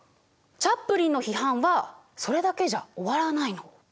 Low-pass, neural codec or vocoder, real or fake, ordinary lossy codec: none; none; real; none